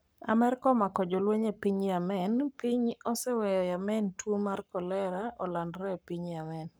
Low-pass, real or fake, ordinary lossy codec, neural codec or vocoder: none; fake; none; codec, 44.1 kHz, 7.8 kbps, Pupu-Codec